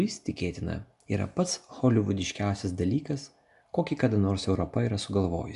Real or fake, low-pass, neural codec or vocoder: real; 10.8 kHz; none